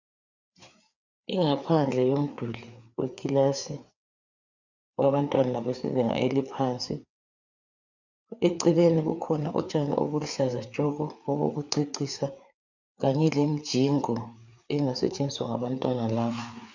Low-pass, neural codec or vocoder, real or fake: 7.2 kHz; codec, 16 kHz, 4 kbps, FreqCodec, larger model; fake